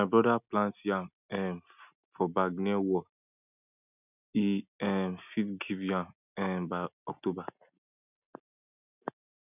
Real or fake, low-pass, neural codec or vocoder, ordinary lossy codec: real; 3.6 kHz; none; none